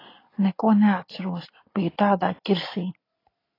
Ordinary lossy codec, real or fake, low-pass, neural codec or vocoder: AAC, 24 kbps; real; 5.4 kHz; none